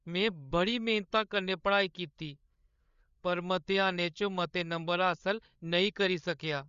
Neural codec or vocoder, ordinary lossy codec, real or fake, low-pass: codec, 16 kHz, 8 kbps, FreqCodec, larger model; Opus, 64 kbps; fake; 7.2 kHz